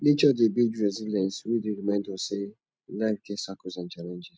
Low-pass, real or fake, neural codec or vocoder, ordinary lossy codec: none; real; none; none